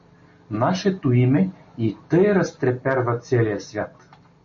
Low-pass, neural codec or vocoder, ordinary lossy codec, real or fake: 7.2 kHz; none; MP3, 32 kbps; real